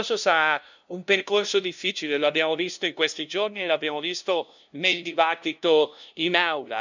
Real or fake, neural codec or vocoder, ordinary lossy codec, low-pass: fake; codec, 16 kHz, 0.5 kbps, FunCodec, trained on LibriTTS, 25 frames a second; none; 7.2 kHz